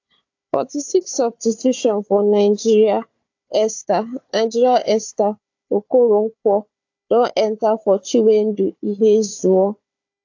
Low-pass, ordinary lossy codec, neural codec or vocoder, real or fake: 7.2 kHz; AAC, 48 kbps; codec, 16 kHz, 4 kbps, FunCodec, trained on Chinese and English, 50 frames a second; fake